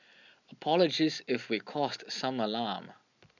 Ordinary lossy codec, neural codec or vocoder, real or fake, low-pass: none; none; real; 7.2 kHz